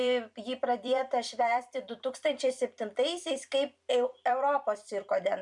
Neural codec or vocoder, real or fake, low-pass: vocoder, 44.1 kHz, 128 mel bands every 256 samples, BigVGAN v2; fake; 10.8 kHz